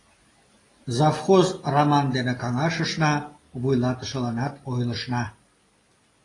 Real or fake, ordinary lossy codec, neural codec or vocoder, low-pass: real; AAC, 32 kbps; none; 10.8 kHz